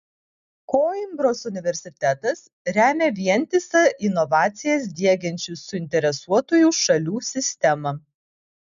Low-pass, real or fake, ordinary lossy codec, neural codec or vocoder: 7.2 kHz; real; MP3, 96 kbps; none